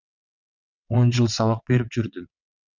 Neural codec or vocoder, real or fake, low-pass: vocoder, 22.05 kHz, 80 mel bands, WaveNeXt; fake; 7.2 kHz